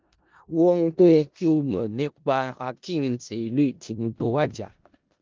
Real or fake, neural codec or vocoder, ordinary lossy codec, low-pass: fake; codec, 16 kHz in and 24 kHz out, 0.4 kbps, LongCat-Audio-Codec, four codebook decoder; Opus, 32 kbps; 7.2 kHz